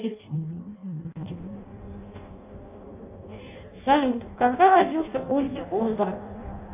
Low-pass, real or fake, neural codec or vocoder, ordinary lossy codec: 3.6 kHz; fake; codec, 16 kHz in and 24 kHz out, 0.6 kbps, FireRedTTS-2 codec; AAC, 32 kbps